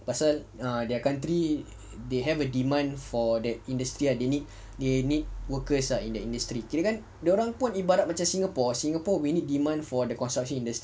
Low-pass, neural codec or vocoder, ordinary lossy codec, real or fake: none; none; none; real